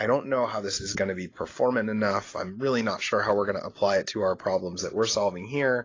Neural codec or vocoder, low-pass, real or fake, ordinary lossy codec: none; 7.2 kHz; real; AAC, 32 kbps